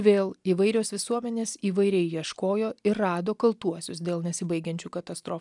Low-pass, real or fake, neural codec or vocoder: 10.8 kHz; real; none